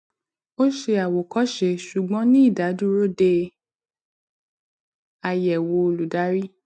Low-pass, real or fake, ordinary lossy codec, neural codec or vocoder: none; real; none; none